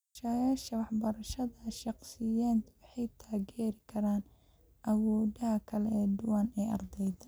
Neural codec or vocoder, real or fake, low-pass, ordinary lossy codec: none; real; none; none